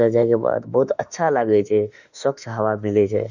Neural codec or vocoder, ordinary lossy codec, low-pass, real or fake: autoencoder, 48 kHz, 32 numbers a frame, DAC-VAE, trained on Japanese speech; none; 7.2 kHz; fake